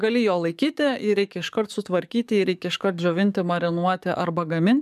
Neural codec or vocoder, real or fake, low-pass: codec, 44.1 kHz, 7.8 kbps, DAC; fake; 14.4 kHz